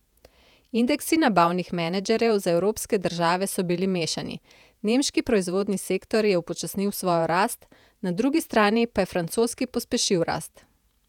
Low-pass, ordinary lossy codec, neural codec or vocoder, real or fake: 19.8 kHz; none; vocoder, 48 kHz, 128 mel bands, Vocos; fake